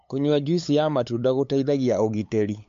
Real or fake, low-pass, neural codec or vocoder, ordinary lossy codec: fake; 7.2 kHz; codec, 16 kHz, 8 kbps, FunCodec, trained on LibriTTS, 25 frames a second; MP3, 48 kbps